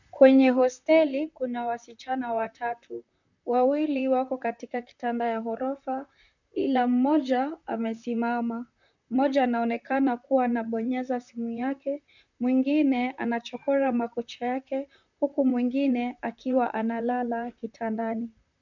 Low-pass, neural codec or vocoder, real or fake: 7.2 kHz; vocoder, 44.1 kHz, 128 mel bands, Pupu-Vocoder; fake